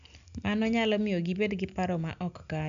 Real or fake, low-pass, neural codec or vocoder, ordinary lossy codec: real; 7.2 kHz; none; none